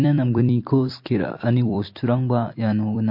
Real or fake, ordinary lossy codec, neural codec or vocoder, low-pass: fake; MP3, 32 kbps; vocoder, 44.1 kHz, 128 mel bands every 256 samples, BigVGAN v2; 5.4 kHz